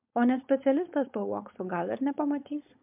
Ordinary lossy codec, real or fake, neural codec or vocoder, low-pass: MP3, 32 kbps; fake; codec, 16 kHz, 4.8 kbps, FACodec; 3.6 kHz